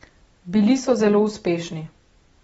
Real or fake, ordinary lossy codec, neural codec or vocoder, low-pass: real; AAC, 24 kbps; none; 19.8 kHz